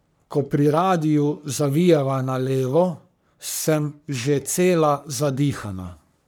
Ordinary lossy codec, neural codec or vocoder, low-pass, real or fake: none; codec, 44.1 kHz, 3.4 kbps, Pupu-Codec; none; fake